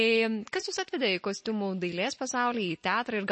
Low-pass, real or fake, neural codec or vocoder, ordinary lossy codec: 9.9 kHz; real; none; MP3, 32 kbps